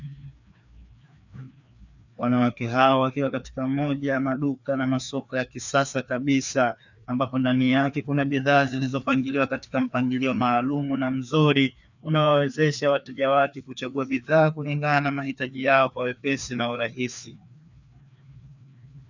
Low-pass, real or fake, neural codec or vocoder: 7.2 kHz; fake; codec, 16 kHz, 2 kbps, FreqCodec, larger model